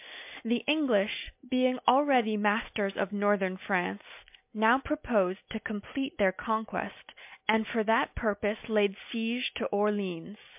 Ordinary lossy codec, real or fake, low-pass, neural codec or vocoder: MP3, 32 kbps; real; 3.6 kHz; none